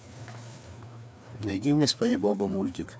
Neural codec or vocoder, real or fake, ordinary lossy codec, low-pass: codec, 16 kHz, 2 kbps, FreqCodec, larger model; fake; none; none